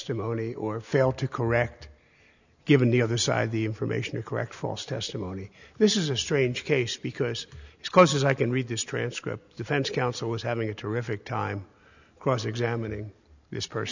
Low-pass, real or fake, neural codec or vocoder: 7.2 kHz; real; none